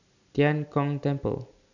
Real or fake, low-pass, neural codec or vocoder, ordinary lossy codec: real; 7.2 kHz; none; none